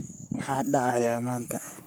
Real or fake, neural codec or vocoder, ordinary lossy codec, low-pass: fake; codec, 44.1 kHz, 3.4 kbps, Pupu-Codec; none; none